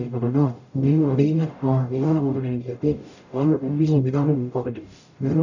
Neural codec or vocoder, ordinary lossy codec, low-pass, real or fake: codec, 44.1 kHz, 0.9 kbps, DAC; none; 7.2 kHz; fake